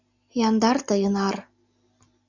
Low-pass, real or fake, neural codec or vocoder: 7.2 kHz; real; none